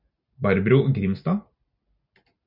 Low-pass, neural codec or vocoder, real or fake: 5.4 kHz; none; real